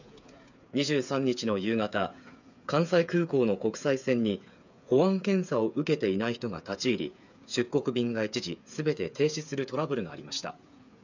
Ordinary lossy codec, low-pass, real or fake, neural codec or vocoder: none; 7.2 kHz; fake; codec, 16 kHz, 8 kbps, FreqCodec, smaller model